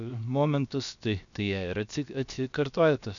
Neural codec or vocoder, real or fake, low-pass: codec, 16 kHz, 0.7 kbps, FocalCodec; fake; 7.2 kHz